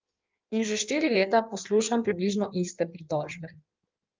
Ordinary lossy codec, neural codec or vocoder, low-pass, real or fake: Opus, 24 kbps; codec, 16 kHz in and 24 kHz out, 1.1 kbps, FireRedTTS-2 codec; 7.2 kHz; fake